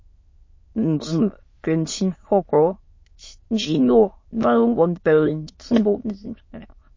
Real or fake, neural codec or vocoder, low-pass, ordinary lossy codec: fake; autoencoder, 22.05 kHz, a latent of 192 numbers a frame, VITS, trained on many speakers; 7.2 kHz; MP3, 32 kbps